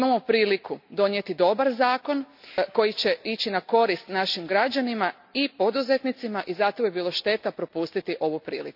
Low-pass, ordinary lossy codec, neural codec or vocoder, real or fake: 5.4 kHz; none; none; real